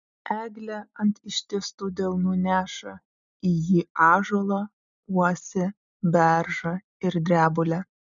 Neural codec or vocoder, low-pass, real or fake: none; 7.2 kHz; real